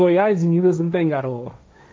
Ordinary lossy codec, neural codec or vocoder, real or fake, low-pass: none; codec, 16 kHz, 1.1 kbps, Voila-Tokenizer; fake; none